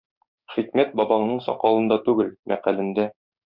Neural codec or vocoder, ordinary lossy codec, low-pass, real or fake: codec, 16 kHz, 6 kbps, DAC; Opus, 64 kbps; 5.4 kHz; fake